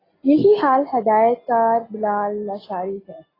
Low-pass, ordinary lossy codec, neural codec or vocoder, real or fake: 5.4 kHz; AAC, 24 kbps; none; real